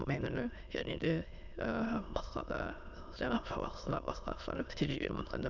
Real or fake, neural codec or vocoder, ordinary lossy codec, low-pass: fake; autoencoder, 22.05 kHz, a latent of 192 numbers a frame, VITS, trained on many speakers; none; 7.2 kHz